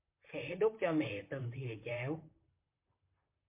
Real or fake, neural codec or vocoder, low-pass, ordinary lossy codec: fake; vocoder, 44.1 kHz, 128 mel bands, Pupu-Vocoder; 3.6 kHz; MP3, 24 kbps